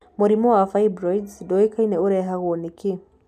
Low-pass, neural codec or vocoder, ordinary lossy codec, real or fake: 14.4 kHz; none; none; real